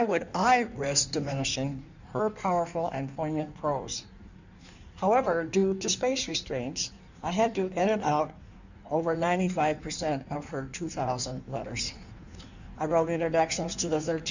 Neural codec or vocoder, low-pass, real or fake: codec, 16 kHz in and 24 kHz out, 1.1 kbps, FireRedTTS-2 codec; 7.2 kHz; fake